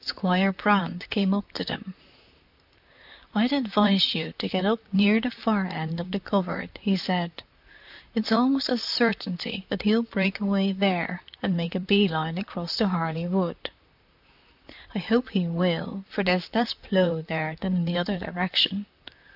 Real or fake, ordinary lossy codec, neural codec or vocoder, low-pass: fake; AAC, 48 kbps; vocoder, 44.1 kHz, 128 mel bands, Pupu-Vocoder; 5.4 kHz